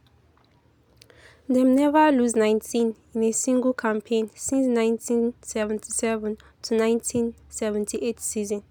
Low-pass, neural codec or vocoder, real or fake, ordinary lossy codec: none; none; real; none